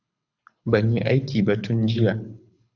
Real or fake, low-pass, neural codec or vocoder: fake; 7.2 kHz; codec, 24 kHz, 6 kbps, HILCodec